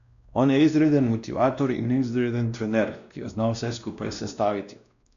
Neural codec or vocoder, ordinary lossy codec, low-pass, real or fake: codec, 16 kHz, 1 kbps, X-Codec, WavLM features, trained on Multilingual LibriSpeech; none; 7.2 kHz; fake